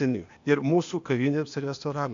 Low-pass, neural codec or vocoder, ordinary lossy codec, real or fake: 7.2 kHz; codec, 16 kHz, 0.8 kbps, ZipCodec; AAC, 64 kbps; fake